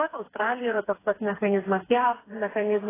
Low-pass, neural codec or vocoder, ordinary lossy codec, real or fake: 3.6 kHz; codec, 16 kHz, 4 kbps, FreqCodec, smaller model; AAC, 16 kbps; fake